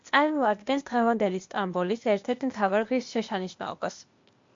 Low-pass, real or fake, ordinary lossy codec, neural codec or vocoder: 7.2 kHz; fake; MP3, 48 kbps; codec, 16 kHz, 0.8 kbps, ZipCodec